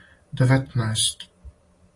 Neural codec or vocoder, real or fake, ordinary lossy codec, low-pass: none; real; AAC, 32 kbps; 10.8 kHz